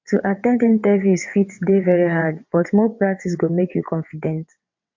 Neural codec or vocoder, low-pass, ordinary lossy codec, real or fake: vocoder, 22.05 kHz, 80 mel bands, WaveNeXt; 7.2 kHz; MP3, 48 kbps; fake